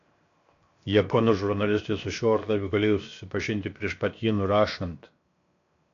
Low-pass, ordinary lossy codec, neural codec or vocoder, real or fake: 7.2 kHz; AAC, 48 kbps; codec, 16 kHz, 0.7 kbps, FocalCodec; fake